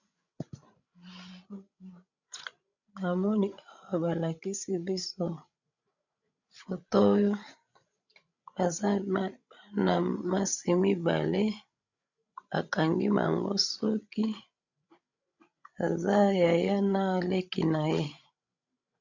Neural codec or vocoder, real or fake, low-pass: codec, 16 kHz, 16 kbps, FreqCodec, larger model; fake; 7.2 kHz